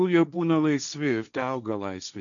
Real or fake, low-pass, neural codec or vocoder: fake; 7.2 kHz; codec, 16 kHz, 1.1 kbps, Voila-Tokenizer